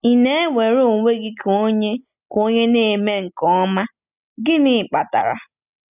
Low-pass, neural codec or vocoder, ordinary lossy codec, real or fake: 3.6 kHz; none; none; real